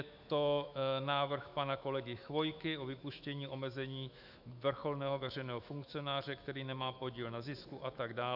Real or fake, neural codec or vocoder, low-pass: fake; autoencoder, 48 kHz, 128 numbers a frame, DAC-VAE, trained on Japanese speech; 5.4 kHz